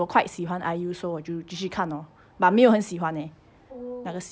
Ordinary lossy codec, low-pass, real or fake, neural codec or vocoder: none; none; real; none